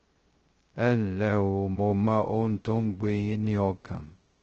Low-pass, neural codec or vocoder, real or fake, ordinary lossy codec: 7.2 kHz; codec, 16 kHz, 0.2 kbps, FocalCodec; fake; Opus, 16 kbps